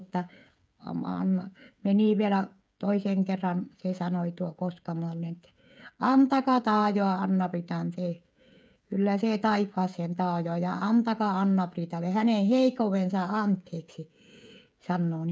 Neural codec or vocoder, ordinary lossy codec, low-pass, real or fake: codec, 16 kHz, 16 kbps, FreqCodec, smaller model; none; none; fake